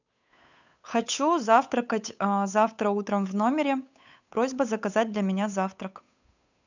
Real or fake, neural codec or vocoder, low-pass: fake; codec, 16 kHz, 8 kbps, FunCodec, trained on Chinese and English, 25 frames a second; 7.2 kHz